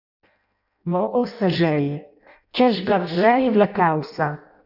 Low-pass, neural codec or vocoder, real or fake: 5.4 kHz; codec, 16 kHz in and 24 kHz out, 0.6 kbps, FireRedTTS-2 codec; fake